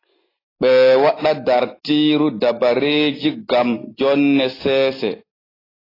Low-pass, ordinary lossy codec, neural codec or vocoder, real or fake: 5.4 kHz; AAC, 24 kbps; none; real